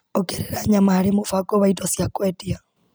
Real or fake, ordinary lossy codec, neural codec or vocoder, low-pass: real; none; none; none